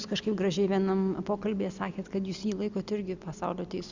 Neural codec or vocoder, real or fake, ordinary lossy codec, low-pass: none; real; Opus, 64 kbps; 7.2 kHz